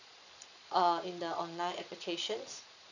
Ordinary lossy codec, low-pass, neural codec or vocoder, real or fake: none; 7.2 kHz; vocoder, 22.05 kHz, 80 mel bands, Vocos; fake